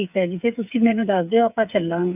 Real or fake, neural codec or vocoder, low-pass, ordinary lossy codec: fake; codec, 16 kHz, 8 kbps, FreqCodec, smaller model; 3.6 kHz; none